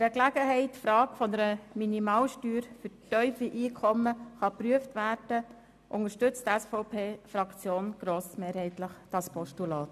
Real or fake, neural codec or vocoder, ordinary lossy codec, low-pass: real; none; MP3, 96 kbps; 14.4 kHz